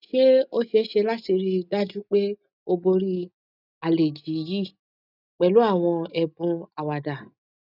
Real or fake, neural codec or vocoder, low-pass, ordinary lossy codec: real; none; 5.4 kHz; none